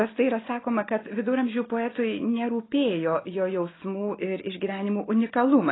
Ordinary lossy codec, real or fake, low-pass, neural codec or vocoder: AAC, 16 kbps; real; 7.2 kHz; none